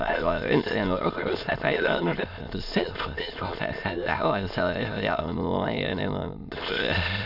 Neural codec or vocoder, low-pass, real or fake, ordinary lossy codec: autoencoder, 22.05 kHz, a latent of 192 numbers a frame, VITS, trained on many speakers; 5.4 kHz; fake; none